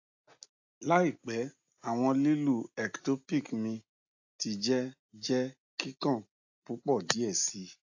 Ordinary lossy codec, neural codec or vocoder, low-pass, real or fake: AAC, 48 kbps; none; 7.2 kHz; real